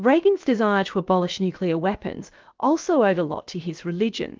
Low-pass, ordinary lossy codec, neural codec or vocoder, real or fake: 7.2 kHz; Opus, 32 kbps; codec, 16 kHz, about 1 kbps, DyCAST, with the encoder's durations; fake